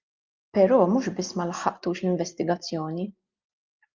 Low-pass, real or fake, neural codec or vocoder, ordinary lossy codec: 7.2 kHz; real; none; Opus, 24 kbps